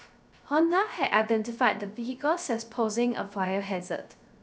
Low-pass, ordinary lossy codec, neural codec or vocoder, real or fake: none; none; codec, 16 kHz, 0.3 kbps, FocalCodec; fake